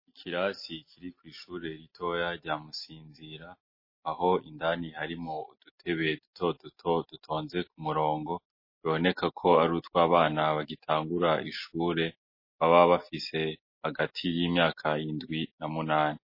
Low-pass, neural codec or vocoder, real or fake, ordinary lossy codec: 5.4 kHz; none; real; MP3, 24 kbps